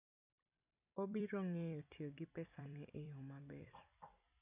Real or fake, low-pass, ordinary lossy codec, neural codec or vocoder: fake; 3.6 kHz; none; vocoder, 44.1 kHz, 128 mel bands every 256 samples, BigVGAN v2